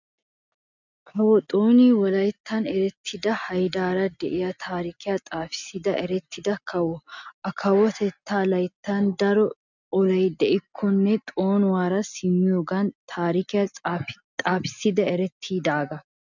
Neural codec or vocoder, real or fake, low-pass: none; real; 7.2 kHz